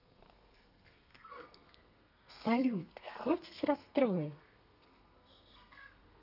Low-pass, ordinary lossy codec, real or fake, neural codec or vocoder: 5.4 kHz; none; fake; codec, 32 kHz, 1.9 kbps, SNAC